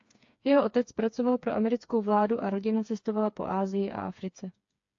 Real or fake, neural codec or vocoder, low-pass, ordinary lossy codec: fake; codec, 16 kHz, 4 kbps, FreqCodec, smaller model; 7.2 kHz; AAC, 48 kbps